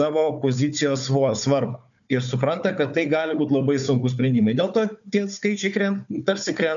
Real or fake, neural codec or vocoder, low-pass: fake; codec, 16 kHz, 4 kbps, FunCodec, trained on Chinese and English, 50 frames a second; 7.2 kHz